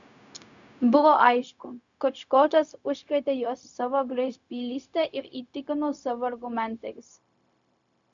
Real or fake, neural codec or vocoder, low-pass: fake; codec, 16 kHz, 0.4 kbps, LongCat-Audio-Codec; 7.2 kHz